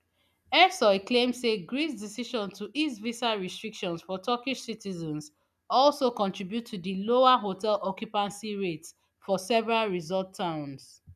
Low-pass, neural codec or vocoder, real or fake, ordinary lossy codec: 14.4 kHz; none; real; none